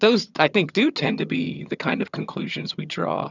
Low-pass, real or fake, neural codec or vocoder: 7.2 kHz; fake; vocoder, 22.05 kHz, 80 mel bands, HiFi-GAN